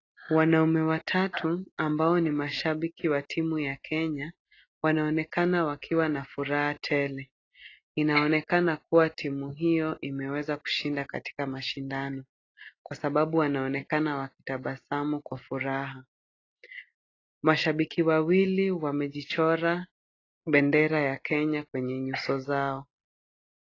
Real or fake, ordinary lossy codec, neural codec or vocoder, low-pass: real; AAC, 32 kbps; none; 7.2 kHz